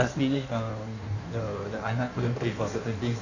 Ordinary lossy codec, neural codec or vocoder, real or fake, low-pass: none; codec, 16 kHz in and 24 kHz out, 1.1 kbps, FireRedTTS-2 codec; fake; 7.2 kHz